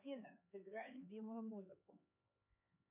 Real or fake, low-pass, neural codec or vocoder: fake; 3.6 kHz; codec, 16 kHz, 4 kbps, X-Codec, HuBERT features, trained on LibriSpeech